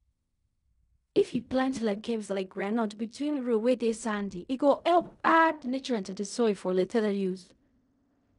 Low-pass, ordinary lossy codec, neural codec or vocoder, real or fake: 10.8 kHz; none; codec, 16 kHz in and 24 kHz out, 0.4 kbps, LongCat-Audio-Codec, fine tuned four codebook decoder; fake